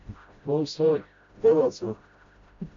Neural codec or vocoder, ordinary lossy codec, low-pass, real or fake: codec, 16 kHz, 0.5 kbps, FreqCodec, smaller model; MP3, 48 kbps; 7.2 kHz; fake